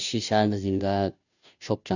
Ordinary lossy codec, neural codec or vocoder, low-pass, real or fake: none; codec, 16 kHz, 0.5 kbps, FunCodec, trained on Chinese and English, 25 frames a second; 7.2 kHz; fake